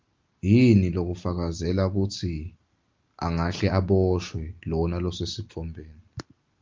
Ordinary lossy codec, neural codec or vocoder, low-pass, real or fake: Opus, 24 kbps; none; 7.2 kHz; real